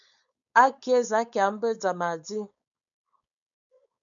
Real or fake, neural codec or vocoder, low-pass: fake; codec, 16 kHz, 4.8 kbps, FACodec; 7.2 kHz